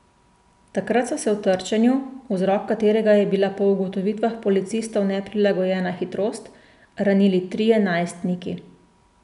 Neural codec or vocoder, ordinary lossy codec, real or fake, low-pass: none; none; real; 10.8 kHz